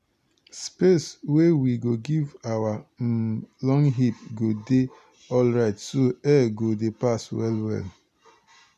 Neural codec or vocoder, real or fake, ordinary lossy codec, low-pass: none; real; none; 14.4 kHz